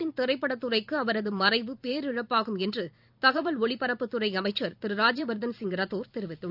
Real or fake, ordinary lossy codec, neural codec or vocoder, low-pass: fake; none; vocoder, 44.1 kHz, 128 mel bands every 256 samples, BigVGAN v2; 5.4 kHz